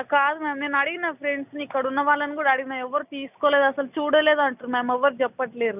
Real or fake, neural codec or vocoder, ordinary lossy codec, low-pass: real; none; none; 3.6 kHz